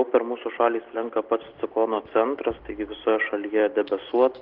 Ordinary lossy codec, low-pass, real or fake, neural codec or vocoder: Opus, 16 kbps; 5.4 kHz; real; none